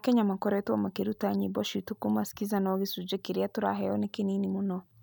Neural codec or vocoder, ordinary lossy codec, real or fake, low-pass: none; none; real; none